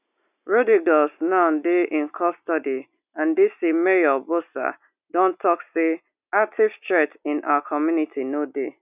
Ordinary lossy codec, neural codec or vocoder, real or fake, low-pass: none; none; real; 3.6 kHz